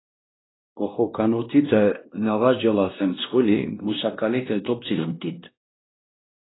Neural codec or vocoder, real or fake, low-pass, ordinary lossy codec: codec, 16 kHz, 1 kbps, X-Codec, WavLM features, trained on Multilingual LibriSpeech; fake; 7.2 kHz; AAC, 16 kbps